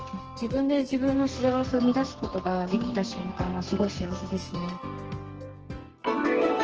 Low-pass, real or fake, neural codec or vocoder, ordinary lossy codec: 7.2 kHz; fake; codec, 32 kHz, 1.9 kbps, SNAC; Opus, 16 kbps